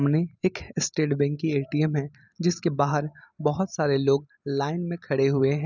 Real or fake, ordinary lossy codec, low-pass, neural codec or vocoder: real; none; 7.2 kHz; none